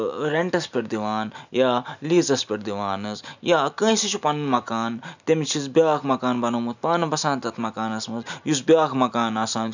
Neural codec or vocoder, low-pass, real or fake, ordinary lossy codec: none; 7.2 kHz; real; none